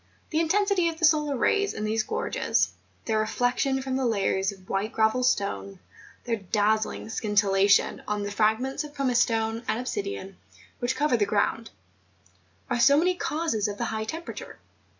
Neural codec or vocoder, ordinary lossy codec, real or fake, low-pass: none; MP3, 64 kbps; real; 7.2 kHz